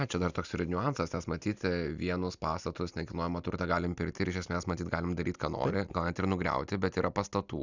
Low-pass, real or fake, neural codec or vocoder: 7.2 kHz; real; none